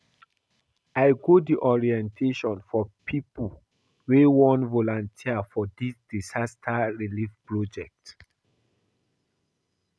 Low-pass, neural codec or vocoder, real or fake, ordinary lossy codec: none; none; real; none